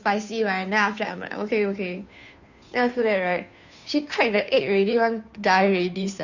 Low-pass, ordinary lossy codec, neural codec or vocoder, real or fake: 7.2 kHz; none; codec, 16 kHz, 2 kbps, FunCodec, trained on Chinese and English, 25 frames a second; fake